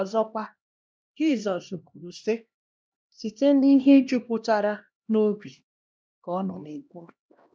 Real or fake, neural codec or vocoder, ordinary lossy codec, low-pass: fake; codec, 16 kHz, 1 kbps, X-Codec, HuBERT features, trained on LibriSpeech; none; none